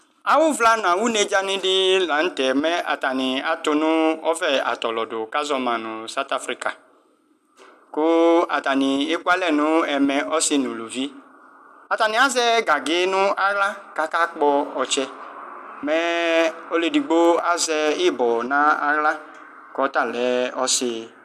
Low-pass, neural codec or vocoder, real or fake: 14.4 kHz; none; real